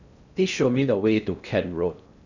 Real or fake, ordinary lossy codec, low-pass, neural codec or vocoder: fake; none; 7.2 kHz; codec, 16 kHz in and 24 kHz out, 0.6 kbps, FocalCodec, streaming, 2048 codes